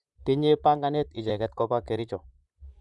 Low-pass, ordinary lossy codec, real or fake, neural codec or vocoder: 10.8 kHz; none; fake; vocoder, 44.1 kHz, 128 mel bands, Pupu-Vocoder